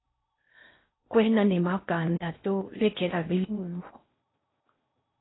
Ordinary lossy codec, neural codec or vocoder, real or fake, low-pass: AAC, 16 kbps; codec, 16 kHz in and 24 kHz out, 0.6 kbps, FocalCodec, streaming, 4096 codes; fake; 7.2 kHz